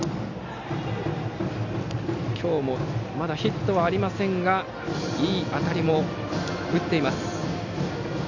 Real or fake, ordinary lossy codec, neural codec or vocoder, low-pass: real; none; none; 7.2 kHz